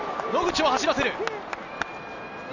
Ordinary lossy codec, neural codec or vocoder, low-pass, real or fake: Opus, 64 kbps; none; 7.2 kHz; real